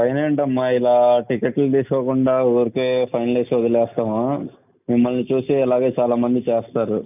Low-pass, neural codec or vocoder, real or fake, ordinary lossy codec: 3.6 kHz; none; real; none